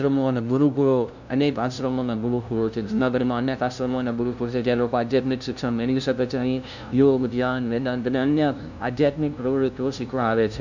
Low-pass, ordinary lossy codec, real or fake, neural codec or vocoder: 7.2 kHz; none; fake; codec, 16 kHz, 0.5 kbps, FunCodec, trained on LibriTTS, 25 frames a second